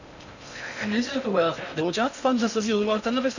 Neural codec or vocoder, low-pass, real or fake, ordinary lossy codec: codec, 16 kHz in and 24 kHz out, 0.6 kbps, FocalCodec, streaming, 2048 codes; 7.2 kHz; fake; none